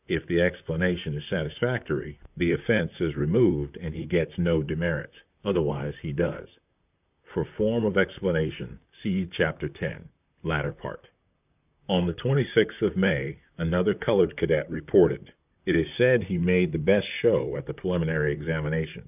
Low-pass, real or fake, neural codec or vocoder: 3.6 kHz; fake; vocoder, 44.1 kHz, 128 mel bands, Pupu-Vocoder